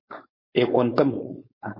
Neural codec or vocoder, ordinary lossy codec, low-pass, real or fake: codec, 16 kHz, 4.8 kbps, FACodec; MP3, 24 kbps; 5.4 kHz; fake